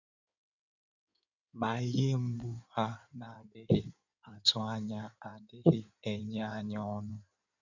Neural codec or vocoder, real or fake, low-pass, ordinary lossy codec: codec, 16 kHz in and 24 kHz out, 2.2 kbps, FireRedTTS-2 codec; fake; 7.2 kHz; none